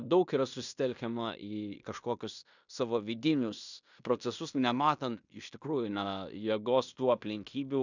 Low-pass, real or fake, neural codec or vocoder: 7.2 kHz; fake; codec, 16 kHz in and 24 kHz out, 0.9 kbps, LongCat-Audio-Codec, fine tuned four codebook decoder